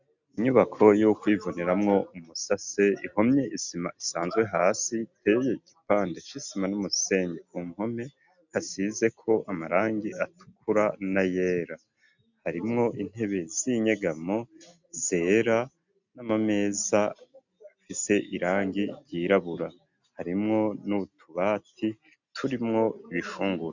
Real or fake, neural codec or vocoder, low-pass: real; none; 7.2 kHz